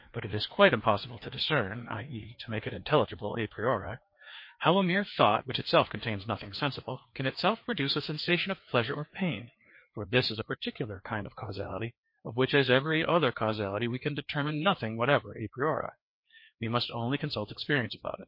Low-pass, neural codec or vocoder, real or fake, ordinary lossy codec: 5.4 kHz; codec, 16 kHz, 2 kbps, FreqCodec, larger model; fake; MP3, 32 kbps